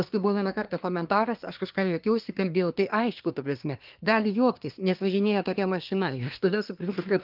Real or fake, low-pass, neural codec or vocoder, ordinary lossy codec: fake; 5.4 kHz; codec, 24 kHz, 1 kbps, SNAC; Opus, 24 kbps